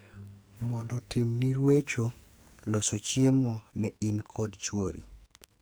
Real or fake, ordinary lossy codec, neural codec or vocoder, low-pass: fake; none; codec, 44.1 kHz, 2.6 kbps, SNAC; none